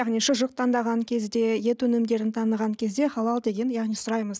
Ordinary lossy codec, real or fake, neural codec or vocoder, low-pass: none; real; none; none